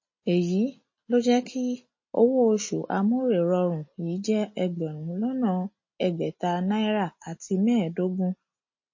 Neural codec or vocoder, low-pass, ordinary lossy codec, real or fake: none; 7.2 kHz; MP3, 32 kbps; real